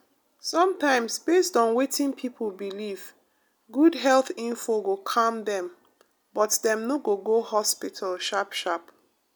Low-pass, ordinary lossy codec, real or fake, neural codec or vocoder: none; none; real; none